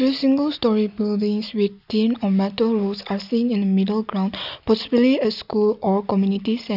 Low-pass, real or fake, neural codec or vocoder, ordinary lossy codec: 5.4 kHz; real; none; none